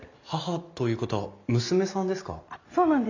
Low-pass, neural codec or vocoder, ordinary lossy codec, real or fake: 7.2 kHz; none; none; real